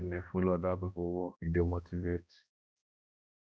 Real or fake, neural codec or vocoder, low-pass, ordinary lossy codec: fake; codec, 16 kHz, 1 kbps, X-Codec, HuBERT features, trained on balanced general audio; none; none